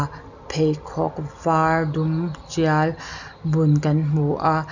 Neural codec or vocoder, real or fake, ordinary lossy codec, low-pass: none; real; none; 7.2 kHz